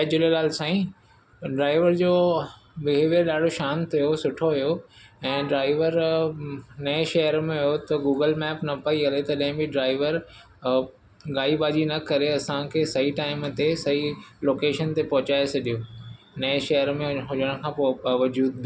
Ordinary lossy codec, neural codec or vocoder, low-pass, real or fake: none; none; none; real